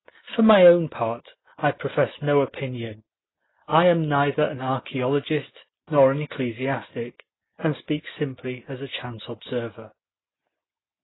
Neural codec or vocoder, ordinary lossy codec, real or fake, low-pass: none; AAC, 16 kbps; real; 7.2 kHz